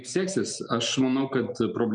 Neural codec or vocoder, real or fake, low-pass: none; real; 9.9 kHz